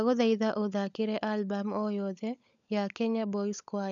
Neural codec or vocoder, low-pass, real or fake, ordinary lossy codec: codec, 16 kHz, 16 kbps, FunCodec, trained on LibriTTS, 50 frames a second; 7.2 kHz; fake; none